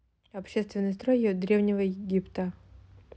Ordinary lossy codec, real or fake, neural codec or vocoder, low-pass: none; real; none; none